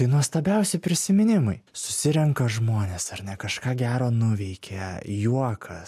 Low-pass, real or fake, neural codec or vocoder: 14.4 kHz; real; none